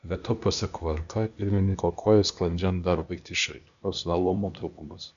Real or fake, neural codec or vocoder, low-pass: fake; codec, 16 kHz, 0.8 kbps, ZipCodec; 7.2 kHz